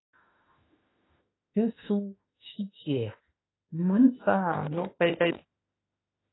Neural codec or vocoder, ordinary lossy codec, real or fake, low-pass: autoencoder, 48 kHz, 32 numbers a frame, DAC-VAE, trained on Japanese speech; AAC, 16 kbps; fake; 7.2 kHz